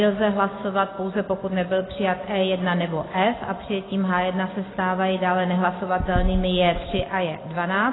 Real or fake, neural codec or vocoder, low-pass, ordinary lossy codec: real; none; 7.2 kHz; AAC, 16 kbps